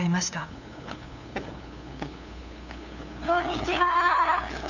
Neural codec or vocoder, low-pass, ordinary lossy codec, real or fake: codec, 16 kHz, 2 kbps, FunCodec, trained on LibriTTS, 25 frames a second; 7.2 kHz; none; fake